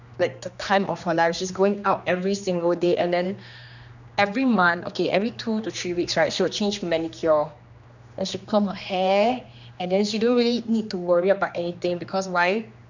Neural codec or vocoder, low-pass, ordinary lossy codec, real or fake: codec, 16 kHz, 2 kbps, X-Codec, HuBERT features, trained on general audio; 7.2 kHz; none; fake